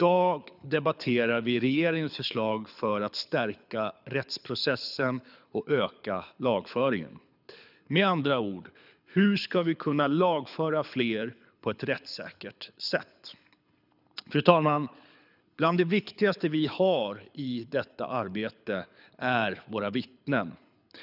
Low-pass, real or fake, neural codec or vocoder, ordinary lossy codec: 5.4 kHz; fake; codec, 24 kHz, 6 kbps, HILCodec; none